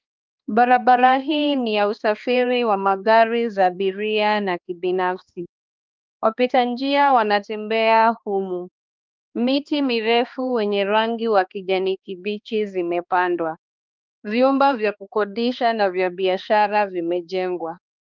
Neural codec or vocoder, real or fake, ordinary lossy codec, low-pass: codec, 16 kHz, 2 kbps, X-Codec, HuBERT features, trained on balanced general audio; fake; Opus, 24 kbps; 7.2 kHz